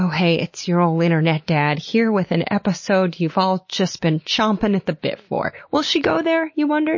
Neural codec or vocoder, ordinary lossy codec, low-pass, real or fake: none; MP3, 32 kbps; 7.2 kHz; real